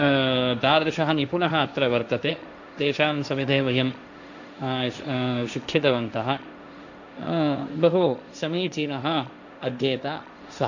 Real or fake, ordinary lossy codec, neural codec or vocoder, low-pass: fake; none; codec, 16 kHz, 1.1 kbps, Voila-Tokenizer; 7.2 kHz